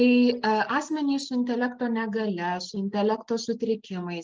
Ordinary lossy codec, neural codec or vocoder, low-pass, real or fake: Opus, 24 kbps; none; 7.2 kHz; real